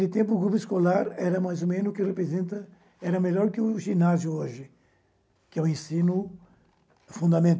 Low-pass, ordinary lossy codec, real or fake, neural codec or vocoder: none; none; real; none